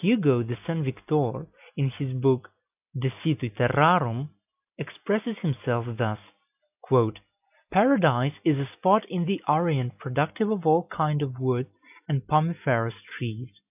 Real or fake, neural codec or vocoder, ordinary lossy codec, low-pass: real; none; AAC, 32 kbps; 3.6 kHz